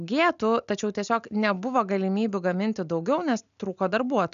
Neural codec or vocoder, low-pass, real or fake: none; 7.2 kHz; real